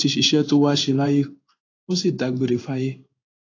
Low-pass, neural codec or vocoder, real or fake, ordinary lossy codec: 7.2 kHz; codec, 16 kHz in and 24 kHz out, 1 kbps, XY-Tokenizer; fake; AAC, 32 kbps